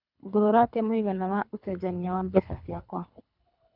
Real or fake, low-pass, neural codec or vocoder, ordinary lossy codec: fake; 5.4 kHz; codec, 24 kHz, 3 kbps, HILCodec; none